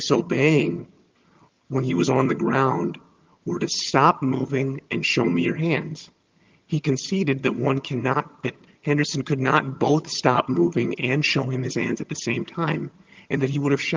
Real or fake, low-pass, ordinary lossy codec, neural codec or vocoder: fake; 7.2 kHz; Opus, 32 kbps; vocoder, 22.05 kHz, 80 mel bands, HiFi-GAN